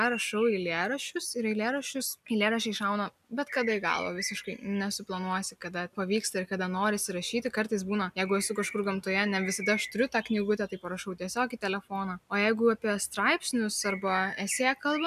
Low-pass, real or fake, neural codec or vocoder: 14.4 kHz; real; none